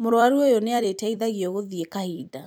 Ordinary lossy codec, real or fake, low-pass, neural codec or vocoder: none; fake; none; vocoder, 44.1 kHz, 128 mel bands every 256 samples, BigVGAN v2